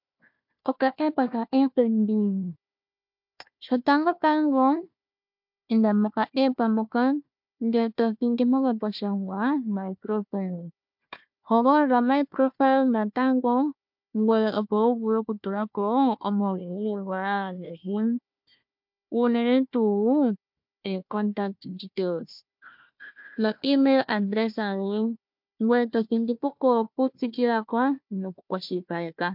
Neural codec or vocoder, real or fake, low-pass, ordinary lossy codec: codec, 16 kHz, 1 kbps, FunCodec, trained on Chinese and English, 50 frames a second; fake; 5.4 kHz; MP3, 48 kbps